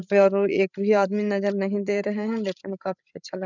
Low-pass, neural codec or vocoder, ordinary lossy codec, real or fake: 7.2 kHz; codec, 16 kHz, 16 kbps, FreqCodec, larger model; none; fake